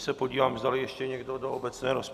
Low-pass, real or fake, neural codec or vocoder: 14.4 kHz; real; none